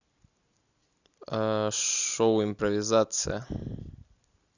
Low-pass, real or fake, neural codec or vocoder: 7.2 kHz; real; none